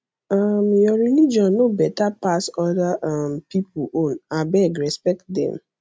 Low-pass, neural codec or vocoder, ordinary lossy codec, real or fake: none; none; none; real